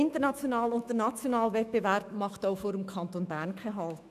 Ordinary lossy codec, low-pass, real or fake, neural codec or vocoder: none; 14.4 kHz; fake; autoencoder, 48 kHz, 128 numbers a frame, DAC-VAE, trained on Japanese speech